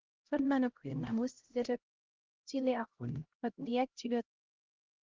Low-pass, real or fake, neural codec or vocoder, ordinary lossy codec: 7.2 kHz; fake; codec, 16 kHz, 0.5 kbps, X-Codec, HuBERT features, trained on LibriSpeech; Opus, 16 kbps